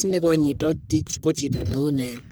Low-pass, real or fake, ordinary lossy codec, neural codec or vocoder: none; fake; none; codec, 44.1 kHz, 1.7 kbps, Pupu-Codec